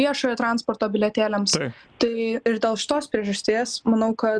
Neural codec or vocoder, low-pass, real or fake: none; 9.9 kHz; real